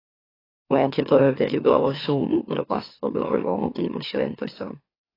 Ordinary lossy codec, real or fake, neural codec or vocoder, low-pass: AAC, 24 kbps; fake; autoencoder, 44.1 kHz, a latent of 192 numbers a frame, MeloTTS; 5.4 kHz